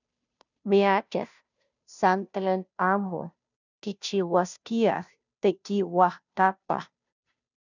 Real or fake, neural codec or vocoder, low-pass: fake; codec, 16 kHz, 0.5 kbps, FunCodec, trained on Chinese and English, 25 frames a second; 7.2 kHz